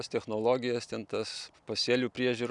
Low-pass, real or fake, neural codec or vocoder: 10.8 kHz; real; none